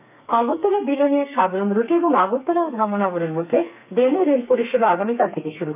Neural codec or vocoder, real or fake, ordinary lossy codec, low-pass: codec, 32 kHz, 1.9 kbps, SNAC; fake; none; 3.6 kHz